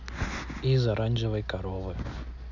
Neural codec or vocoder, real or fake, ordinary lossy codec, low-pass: none; real; none; 7.2 kHz